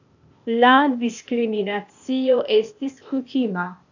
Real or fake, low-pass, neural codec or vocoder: fake; 7.2 kHz; codec, 16 kHz, 0.8 kbps, ZipCodec